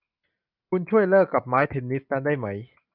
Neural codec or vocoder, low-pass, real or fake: none; 5.4 kHz; real